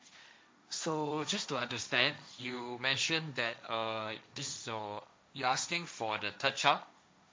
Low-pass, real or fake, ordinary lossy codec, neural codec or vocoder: none; fake; none; codec, 16 kHz, 1.1 kbps, Voila-Tokenizer